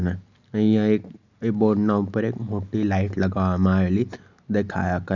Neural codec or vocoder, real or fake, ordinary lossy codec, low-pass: none; real; none; 7.2 kHz